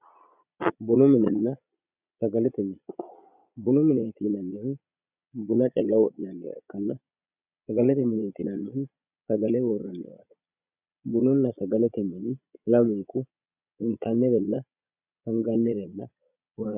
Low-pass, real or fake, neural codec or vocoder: 3.6 kHz; fake; vocoder, 22.05 kHz, 80 mel bands, Vocos